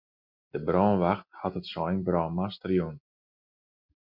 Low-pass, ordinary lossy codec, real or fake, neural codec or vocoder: 5.4 kHz; MP3, 48 kbps; real; none